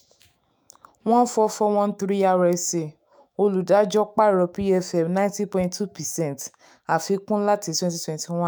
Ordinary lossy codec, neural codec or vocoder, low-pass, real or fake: none; autoencoder, 48 kHz, 128 numbers a frame, DAC-VAE, trained on Japanese speech; none; fake